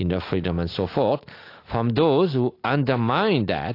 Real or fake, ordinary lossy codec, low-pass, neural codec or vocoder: real; AAC, 32 kbps; 5.4 kHz; none